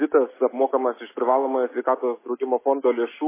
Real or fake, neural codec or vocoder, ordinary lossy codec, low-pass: real; none; MP3, 16 kbps; 3.6 kHz